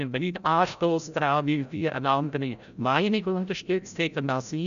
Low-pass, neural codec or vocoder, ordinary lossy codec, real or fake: 7.2 kHz; codec, 16 kHz, 0.5 kbps, FreqCodec, larger model; none; fake